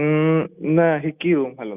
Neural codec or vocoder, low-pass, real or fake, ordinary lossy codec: none; 3.6 kHz; real; none